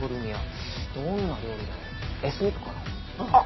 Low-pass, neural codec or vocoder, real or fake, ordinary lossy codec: 7.2 kHz; none; real; MP3, 24 kbps